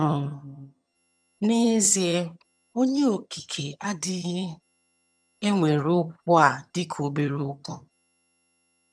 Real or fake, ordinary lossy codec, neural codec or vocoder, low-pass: fake; none; vocoder, 22.05 kHz, 80 mel bands, HiFi-GAN; none